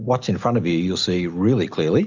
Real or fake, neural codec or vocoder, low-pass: real; none; 7.2 kHz